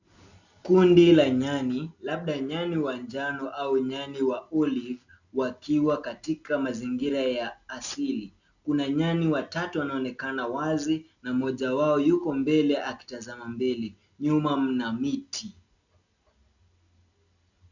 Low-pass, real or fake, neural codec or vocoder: 7.2 kHz; real; none